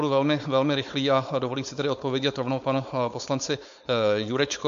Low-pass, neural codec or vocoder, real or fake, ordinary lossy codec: 7.2 kHz; codec, 16 kHz, 4.8 kbps, FACodec; fake; MP3, 64 kbps